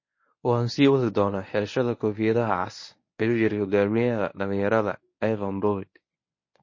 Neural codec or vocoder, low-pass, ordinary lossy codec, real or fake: codec, 24 kHz, 0.9 kbps, WavTokenizer, medium speech release version 1; 7.2 kHz; MP3, 32 kbps; fake